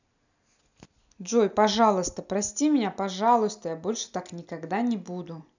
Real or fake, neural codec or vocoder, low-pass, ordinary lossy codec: real; none; 7.2 kHz; none